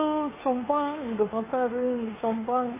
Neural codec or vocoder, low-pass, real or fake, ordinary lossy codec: codec, 16 kHz, 2 kbps, FunCodec, trained on Chinese and English, 25 frames a second; 3.6 kHz; fake; MP3, 16 kbps